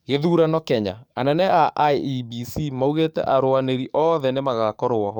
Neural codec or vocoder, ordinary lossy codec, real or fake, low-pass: codec, 44.1 kHz, 7.8 kbps, DAC; none; fake; 19.8 kHz